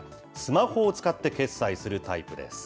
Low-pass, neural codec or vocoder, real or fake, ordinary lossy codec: none; none; real; none